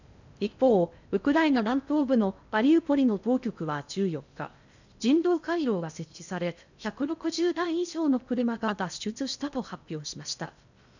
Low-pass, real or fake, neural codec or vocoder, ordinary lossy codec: 7.2 kHz; fake; codec, 16 kHz in and 24 kHz out, 0.6 kbps, FocalCodec, streaming, 4096 codes; none